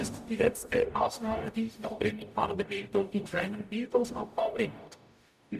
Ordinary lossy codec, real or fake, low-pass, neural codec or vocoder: none; fake; 14.4 kHz; codec, 44.1 kHz, 0.9 kbps, DAC